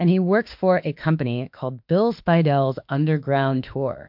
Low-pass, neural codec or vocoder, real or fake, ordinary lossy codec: 5.4 kHz; autoencoder, 48 kHz, 32 numbers a frame, DAC-VAE, trained on Japanese speech; fake; MP3, 48 kbps